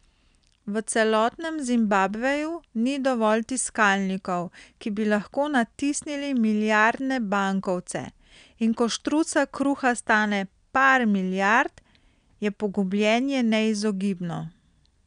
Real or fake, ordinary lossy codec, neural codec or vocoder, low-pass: real; none; none; 9.9 kHz